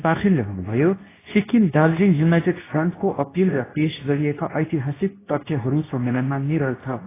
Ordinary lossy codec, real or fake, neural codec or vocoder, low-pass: AAC, 16 kbps; fake; codec, 24 kHz, 0.9 kbps, WavTokenizer, medium speech release version 2; 3.6 kHz